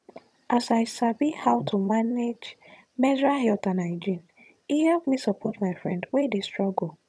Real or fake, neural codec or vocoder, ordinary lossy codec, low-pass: fake; vocoder, 22.05 kHz, 80 mel bands, HiFi-GAN; none; none